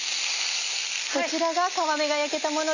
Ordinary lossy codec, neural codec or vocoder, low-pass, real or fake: none; none; 7.2 kHz; real